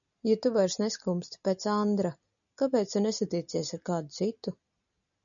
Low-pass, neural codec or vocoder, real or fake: 7.2 kHz; none; real